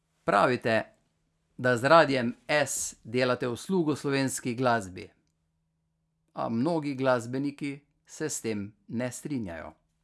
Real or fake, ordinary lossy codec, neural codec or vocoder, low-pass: real; none; none; none